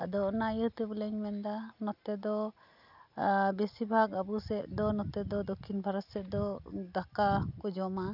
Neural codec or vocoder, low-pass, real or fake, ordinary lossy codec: none; 5.4 kHz; real; none